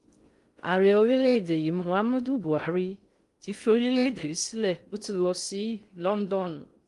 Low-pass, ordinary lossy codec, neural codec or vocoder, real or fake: 10.8 kHz; Opus, 32 kbps; codec, 16 kHz in and 24 kHz out, 0.6 kbps, FocalCodec, streaming, 2048 codes; fake